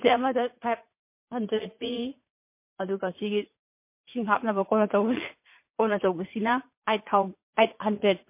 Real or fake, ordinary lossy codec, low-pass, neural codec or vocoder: fake; MP3, 24 kbps; 3.6 kHz; vocoder, 44.1 kHz, 80 mel bands, Vocos